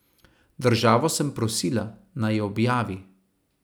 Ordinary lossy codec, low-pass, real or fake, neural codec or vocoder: none; none; real; none